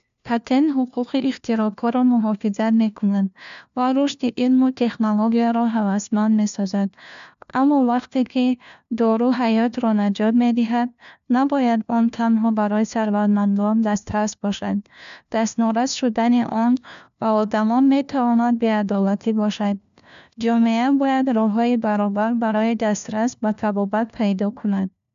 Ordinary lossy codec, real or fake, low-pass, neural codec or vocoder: AAC, 96 kbps; fake; 7.2 kHz; codec, 16 kHz, 1 kbps, FunCodec, trained on LibriTTS, 50 frames a second